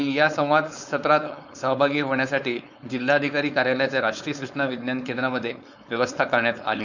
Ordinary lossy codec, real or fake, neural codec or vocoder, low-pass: none; fake; codec, 16 kHz, 4.8 kbps, FACodec; 7.2 kHz